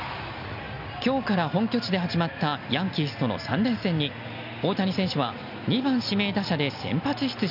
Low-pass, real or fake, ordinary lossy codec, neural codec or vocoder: 5.4 kHz; real; none; none